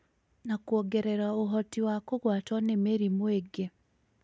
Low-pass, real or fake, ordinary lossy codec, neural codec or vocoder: none; real; none; none